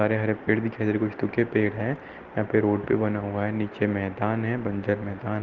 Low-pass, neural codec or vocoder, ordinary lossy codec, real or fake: 7.2 kHz; none; Opus, 16 kbps; real